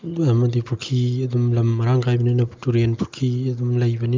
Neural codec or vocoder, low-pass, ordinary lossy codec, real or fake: none; 7.2 kHz; Opus, 24 kbps; real